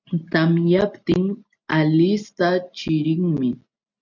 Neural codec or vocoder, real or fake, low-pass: none; real; 7.2 kHz